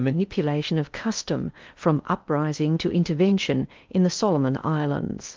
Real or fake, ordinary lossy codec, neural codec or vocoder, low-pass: fake; Opus, 32 kbps; codec, 16 kHz in and 24 kHz out, 0.8 kbps, FocalCodec, streaming, 65536 codes; 7.2 kHz